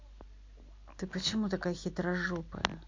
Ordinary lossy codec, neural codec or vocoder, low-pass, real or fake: MP3, 48 kbps; none; 7.2 kHz; real